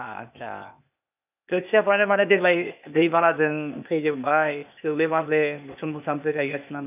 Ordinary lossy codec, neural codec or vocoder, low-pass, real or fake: none; codec, 16 kHz, 0.8 kbps, ZipCodec; 3.6 kHz; fake